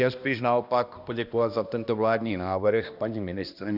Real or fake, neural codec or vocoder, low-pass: fake; codec, 16 kHz, 1 kbps, X-Codec, HuBERT features, trained on balanced general audio; 5.4 kHz